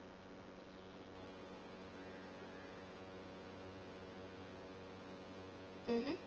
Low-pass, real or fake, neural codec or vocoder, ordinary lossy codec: 7.2 kHz; fake; vocoder, 24 kHz, 100 mel bands, Vocos; Opus, 16 kbps